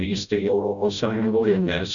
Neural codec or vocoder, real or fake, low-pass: codec, 16 kHz, 0.5 kbps, FreqCodec, smaller model; fake; 7.2 kHz